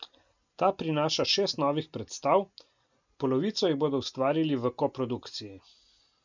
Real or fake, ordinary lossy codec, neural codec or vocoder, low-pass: real; none; none; 7.2 kHz